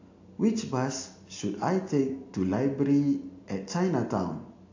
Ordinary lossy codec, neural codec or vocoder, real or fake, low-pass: AAC, 48 kbps; none; real; 7.2 kHz